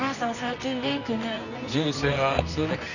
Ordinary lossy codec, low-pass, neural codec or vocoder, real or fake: none; 7.2 kHz; codec, 24 kHz, 0.9 kbps, WavTokenizer, medium music audio release; fake